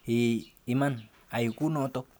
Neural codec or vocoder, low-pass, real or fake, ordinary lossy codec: none; none; real; none